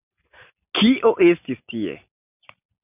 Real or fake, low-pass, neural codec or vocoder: real; 3.6 kHz; none